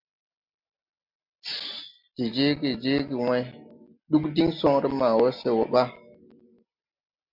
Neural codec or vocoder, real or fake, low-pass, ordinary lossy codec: none; real; 5.4 kHz; MP3, 48 kbps